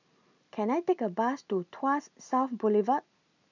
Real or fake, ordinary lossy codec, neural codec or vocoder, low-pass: real; none; none; 7.2 kHz